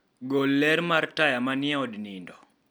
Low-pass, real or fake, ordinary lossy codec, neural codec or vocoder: none; real; none; none